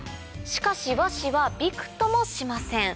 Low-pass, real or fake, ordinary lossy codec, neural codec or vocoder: none; real; none; none